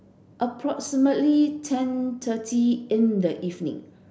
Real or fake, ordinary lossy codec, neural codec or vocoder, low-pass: real; none; none; none